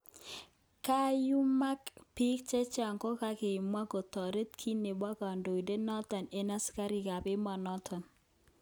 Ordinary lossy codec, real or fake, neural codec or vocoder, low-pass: none; real; none; none